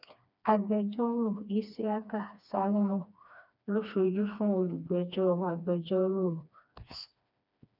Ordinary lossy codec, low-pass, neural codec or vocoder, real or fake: none; 5.4 kHz; codec, 16 kHz, 2 kbps, FreqCodec, smaller model; fake